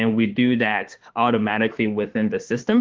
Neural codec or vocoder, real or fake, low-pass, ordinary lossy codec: autoencoder, 48 kHz, 32 numbers a frame, DAC-VAE, trained on Japanese speech; fake; 7.2 kHz; Opus, 16 kbps